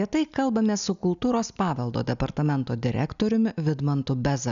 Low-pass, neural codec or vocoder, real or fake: 7.2 kHz; none; real